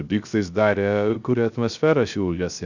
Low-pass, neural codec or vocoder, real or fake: 7.2 kHz; codec, 16 kHz, 0.3 kbps, FocalCodec; fake